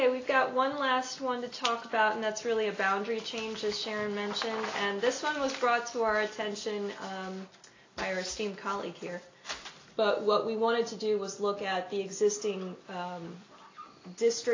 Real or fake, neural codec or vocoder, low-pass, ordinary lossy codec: real; none; 7.2 kHz; AAC, 32 kbps